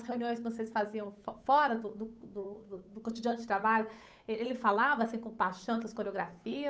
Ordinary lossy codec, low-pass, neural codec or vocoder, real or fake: none; none; codec, 16 kHz, 8 kbps, FunCodec, trained on Chinese and English, 25 frames a second; fake